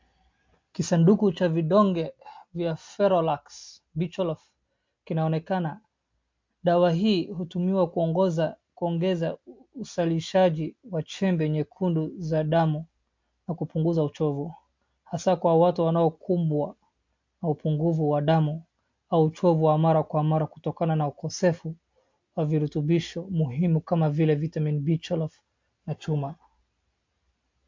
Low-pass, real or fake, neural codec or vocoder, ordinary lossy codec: 7.2 kHz; real; none; MP3, 48 kbps